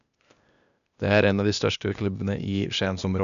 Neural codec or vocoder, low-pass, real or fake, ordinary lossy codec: codec, 16 kHz, 0.8 kbps, ZipCodec; 7.2 kHz; fake; none